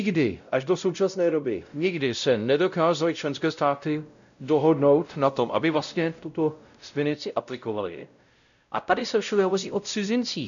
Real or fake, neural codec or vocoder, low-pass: fake; codec, 16 kHz, 0.5 kbps, X-Codec, WavLM features, trained on Multilingual LibriSpeech; 7.2 kHz